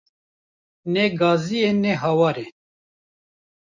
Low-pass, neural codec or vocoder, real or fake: 7.2 kHz; none; real